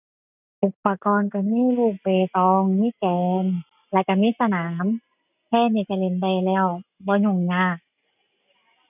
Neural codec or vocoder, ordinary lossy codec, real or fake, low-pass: none; none; real; 3.6 kHz